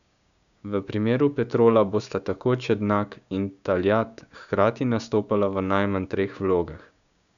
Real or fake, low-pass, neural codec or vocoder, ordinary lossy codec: fake; 7.2 kHz; codec, 16 kHz, 6 kbps, DAC; none